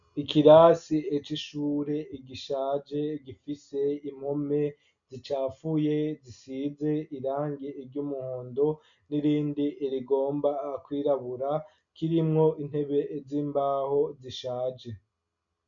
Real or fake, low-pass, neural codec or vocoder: real; 7.2 kHz; none